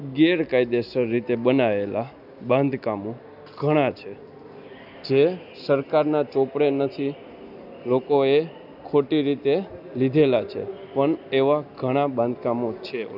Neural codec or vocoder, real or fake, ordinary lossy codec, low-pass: none; real; none; 5.4 kHz